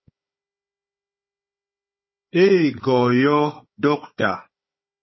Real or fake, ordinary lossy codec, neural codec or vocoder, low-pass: fake; MP3, 24 kbps; codec, 16 kHz, 4 kbps, FunCodec, trained on Chinese and English, 50 frames a second; 7.2 kHz